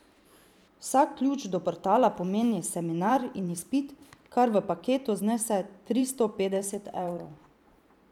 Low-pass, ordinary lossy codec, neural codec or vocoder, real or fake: 19.8 kHz; none; vocoder, 44.1 kHz, 128 mel bands every 512 samples, BigVGAN v2; fake